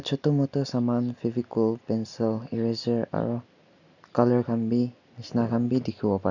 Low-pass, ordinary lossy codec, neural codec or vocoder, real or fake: 7.2 kHz; none; none; real